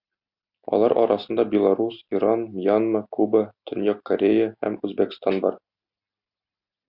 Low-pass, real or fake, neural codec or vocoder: 5.4 kHz; real; none